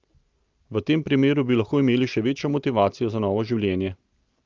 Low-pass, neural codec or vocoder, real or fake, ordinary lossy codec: 7.2 kHz; none; real; Opus, 24 kbps